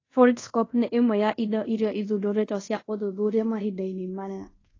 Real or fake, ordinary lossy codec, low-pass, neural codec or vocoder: fake; AAC, 32 kbps; 7.2 kHz; codec, 24 kHz, 0.5 kbps, DualCodec